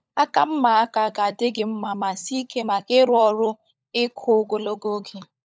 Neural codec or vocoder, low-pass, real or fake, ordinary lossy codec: codec, 16 kHz, 16 kbps, FunCodec, trained on LibriTTS, 50 frames a second; none; fake; none